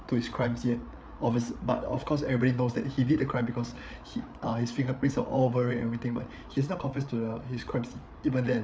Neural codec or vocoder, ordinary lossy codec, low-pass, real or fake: codec, 16 kHz, 8 kbps, FreqCodec, larger model; none; none; fake